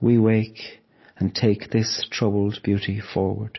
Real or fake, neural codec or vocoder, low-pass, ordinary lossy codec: real; none; 7.2 kHz; MP3, 24 kbps